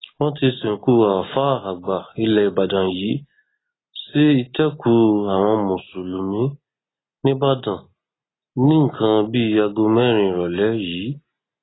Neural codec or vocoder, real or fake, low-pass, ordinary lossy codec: none; real; 7.2 kHz; AAC, 16 kbps